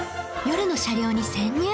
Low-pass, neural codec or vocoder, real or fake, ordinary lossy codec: none; none; real; none